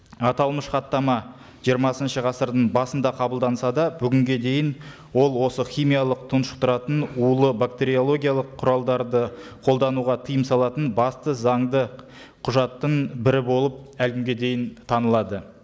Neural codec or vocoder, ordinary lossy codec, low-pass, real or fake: none; none; none; real